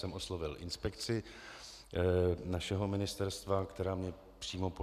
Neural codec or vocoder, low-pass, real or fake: none; 14.4 kHz; real